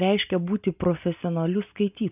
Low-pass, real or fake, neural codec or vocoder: 3.6 kHz; real; none